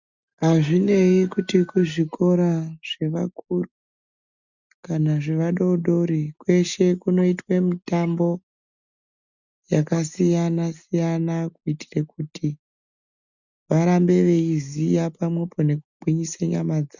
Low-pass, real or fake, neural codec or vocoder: 7.2 kHz; real; none